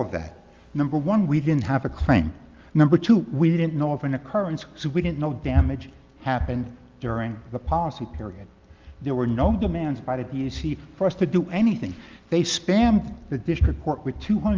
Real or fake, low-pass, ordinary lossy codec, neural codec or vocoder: real; 7.2 kHz; Opus, 32 kbps; none